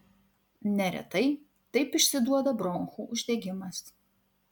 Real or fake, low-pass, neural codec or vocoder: real; 19.8 kHz; none